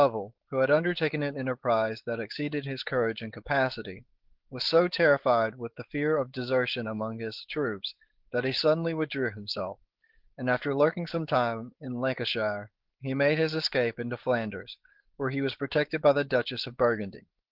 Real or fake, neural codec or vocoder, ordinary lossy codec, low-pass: fake; codec, 16 kHz, 4.8 kbps, FACodec; Opus, 16 kbps; 5.4 kHz